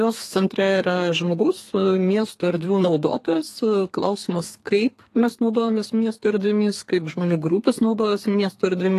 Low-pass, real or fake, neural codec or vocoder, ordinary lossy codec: 14.4 kHz; fake; codec, 32 kHz, 1.9 kbps, SNAC; AAC, 48 kbps